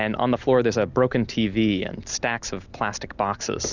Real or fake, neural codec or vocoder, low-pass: real; none; 7.2 kHz